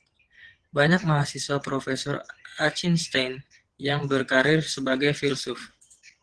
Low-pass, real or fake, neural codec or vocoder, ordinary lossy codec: 9.9 kHz; fake; vocoder, 22.05 kHz, 80 mel bands, WaveNeXt; Opus, 16 kbps